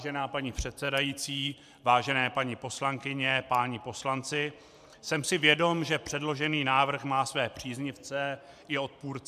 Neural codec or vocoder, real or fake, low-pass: vocoder, 44.1 kHz, 128 mel bands every 512 samples, BigVGAN v2; fake; 14.4 kHz